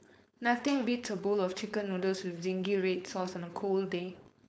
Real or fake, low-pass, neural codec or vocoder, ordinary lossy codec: fake; none; codec, 16 kHz, 4.8 kbps, FACodec; none